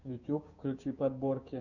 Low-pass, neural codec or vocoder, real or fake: 7.2 kHz; codec, 16 kHz, 6 kbps, DAC; fake